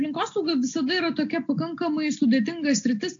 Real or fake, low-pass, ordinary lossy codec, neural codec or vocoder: real; 7.2 kHz; AAC, 48 kbps; none